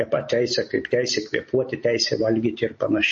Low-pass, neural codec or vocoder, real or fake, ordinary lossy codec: 10.8 kHz; none; real; MP3, 32 kbps